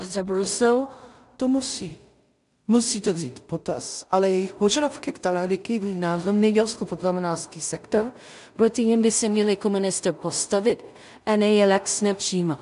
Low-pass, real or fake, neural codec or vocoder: 10.8 kHz; fake; codec, 16 kHz in and 24 kHz out, 0.4 kbps, LongCat-Audio-Codec, two codebook decoder